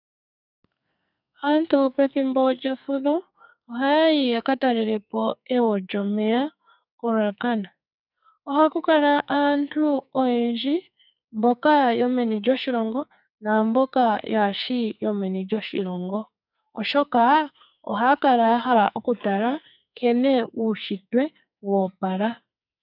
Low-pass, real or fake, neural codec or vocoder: 5.4 kHz; fake; codec, 44.1 kHz, 2.6 kbps, SNAC